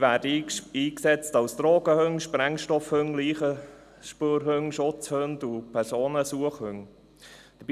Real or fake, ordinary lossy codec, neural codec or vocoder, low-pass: real; none; none; 14.4 kHz